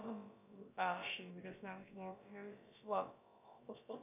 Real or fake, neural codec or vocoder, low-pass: fake; codec, 16 kHz, about 1 kbps, DyCAST, with the encoder's durations; 3.6 kHz